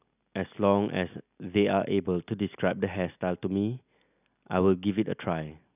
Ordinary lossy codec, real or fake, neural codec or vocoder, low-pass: none; real; none; 3.6 kHz